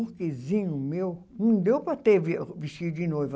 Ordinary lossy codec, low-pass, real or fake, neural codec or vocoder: none; none; real; none